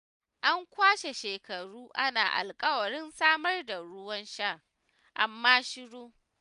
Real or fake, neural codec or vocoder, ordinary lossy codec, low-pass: real; none; none; 10.8 kHz